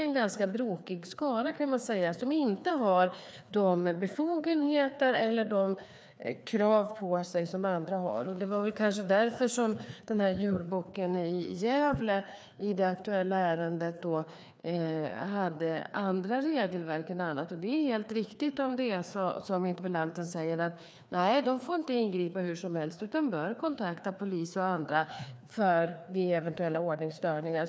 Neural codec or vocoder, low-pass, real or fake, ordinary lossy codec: codec, 16 kHz, 2 kbps, FreqCodec, larger model; none; fake; none